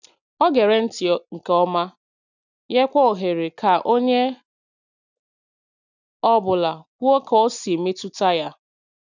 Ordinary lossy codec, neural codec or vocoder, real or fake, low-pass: none; none; real; 7.2 kHz